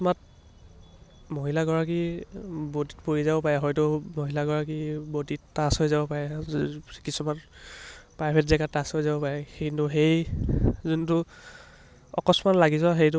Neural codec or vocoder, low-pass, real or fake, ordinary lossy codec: none; none; real; none